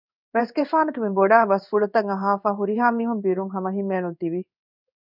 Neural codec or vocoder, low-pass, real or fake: codec, 16 kHz in and 24 kHz out, 1 kbps, XY-Tokenizer; 5.4 kHz; fake